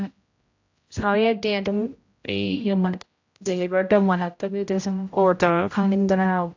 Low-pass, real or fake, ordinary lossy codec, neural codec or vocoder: 7.2 kHz; fake; AAC, 48 kbps; codec, 16 kHz, 0.5 kbps, X-Codec, HuBERT features, trained on general audio